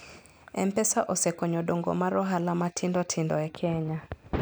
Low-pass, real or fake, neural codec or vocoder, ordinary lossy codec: none; real; none; none